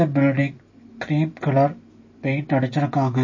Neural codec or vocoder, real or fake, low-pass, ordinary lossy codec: none; real; 7.2 kHz; MP3, 32 kbps